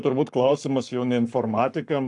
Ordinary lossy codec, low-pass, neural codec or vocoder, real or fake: AAC, 64 kbps; 10.8 kHz; codec, 44.1 kHz, 7.8 kbps, Pupu-Codec; fake